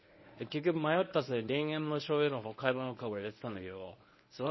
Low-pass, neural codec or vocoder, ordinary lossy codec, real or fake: 7.2 kHz; codec, 24 kHz, 0.9 kbps, WavTokenizer, medium speech release version 1; MP3, 24 kbps; fake